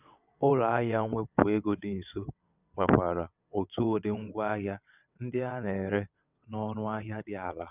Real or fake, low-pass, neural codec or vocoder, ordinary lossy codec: fake; 3.6 kHz; vocoder, 22.05 kHz, 80 mel bands, WaveNeXt; none